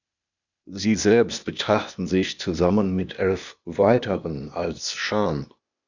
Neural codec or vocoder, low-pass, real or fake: codec, 16 kHz, 0.8 kbps, ZipCodec; 7.2 kHz; fake